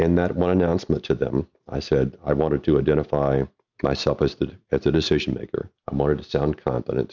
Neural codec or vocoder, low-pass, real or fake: none; 7.2 kHz; real